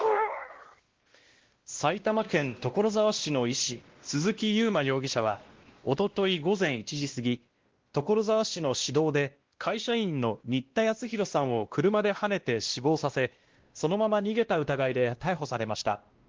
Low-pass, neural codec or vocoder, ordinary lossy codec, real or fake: 7.2 kHz; codec, 16 kHz, 1 kbps, X-Codec, WavLM features, trained on Multilingual LibriSpeech; Opus, 16 kbps; fake